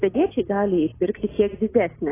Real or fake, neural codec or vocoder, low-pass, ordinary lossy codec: real; none; 3.6 kHz; AAC, 16 kbps